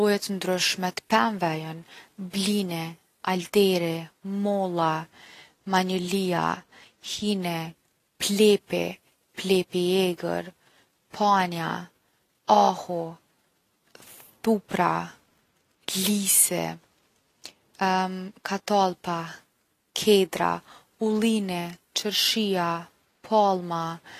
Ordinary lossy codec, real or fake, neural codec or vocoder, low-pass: AAC, 48 kbps; real; none; 14.4 kHz